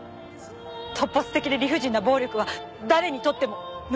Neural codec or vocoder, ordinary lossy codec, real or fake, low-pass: none; none; real; none